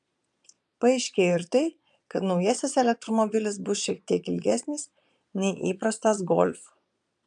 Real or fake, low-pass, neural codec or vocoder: fake; 9.9 kHz; vocoder, 22.05 kHz, 80 mel bands, Vocos